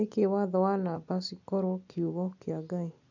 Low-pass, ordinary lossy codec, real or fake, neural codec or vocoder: 7.2 kHz; none; real; none